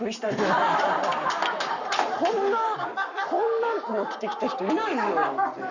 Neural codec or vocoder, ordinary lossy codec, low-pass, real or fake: codec, 44.1 kHz, 7.8 kbps, Pupu-Codec; none; 7.2 kHz; fake